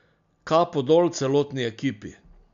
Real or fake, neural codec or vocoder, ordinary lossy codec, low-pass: real; none; MP3, 64 kbps; 7.2 kHz